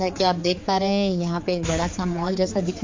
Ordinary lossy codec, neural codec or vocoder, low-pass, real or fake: MP3, 48 kbps; codec, 16 kHz, 4 kbps, X-Codec, HuBERT features, trained on general audio; 7.2 kHz; fake